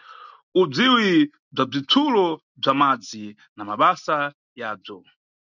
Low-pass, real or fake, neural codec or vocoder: 7.2 kHz; real; none